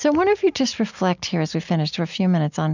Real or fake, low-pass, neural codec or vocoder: real; 7.2 kHz; none